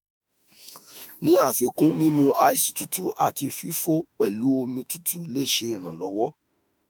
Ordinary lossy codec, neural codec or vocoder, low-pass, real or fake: none; autoencoder, 48 kHz, 32 numbers a frame, DAC-VAE, trained on Japanese speech; none; fake